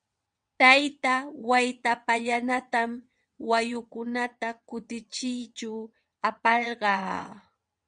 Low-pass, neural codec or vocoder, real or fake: 9.9 kHz; vocoder, 22.05 kHz, 80 mel bands, WaveNeXt; fake